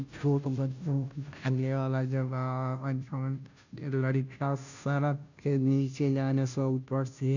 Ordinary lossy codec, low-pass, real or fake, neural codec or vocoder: none; 7.2 kHz; fake; codec, 16 kHz, 0.5 kbps, FunCodec, trained on Chinese and English, 25 frames a second